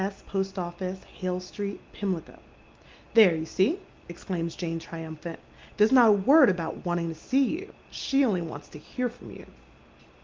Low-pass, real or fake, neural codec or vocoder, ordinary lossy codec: 7.2 kHz; real; none; Opus, 32 kbps